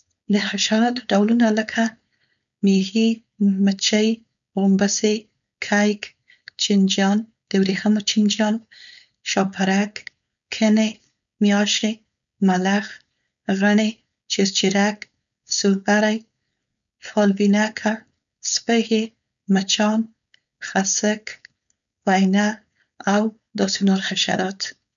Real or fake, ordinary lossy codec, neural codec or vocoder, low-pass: fake; none; codec, 16 kHz, 4.8 kbps, FACodec; 7.2 kHz